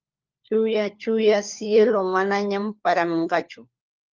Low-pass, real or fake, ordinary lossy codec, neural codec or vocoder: 7.2 kHz; fake; Opus, 32 kbps; codec, 16 kHz, 4 kbps, FunCodec, trained on LibriTTS, 50 frames a second